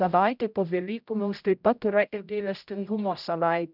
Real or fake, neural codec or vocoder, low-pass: fake; codec, 16 kHz, 0.5 kbps, X-Codec, HuBERT features, trained on general audio; 5.4 kHz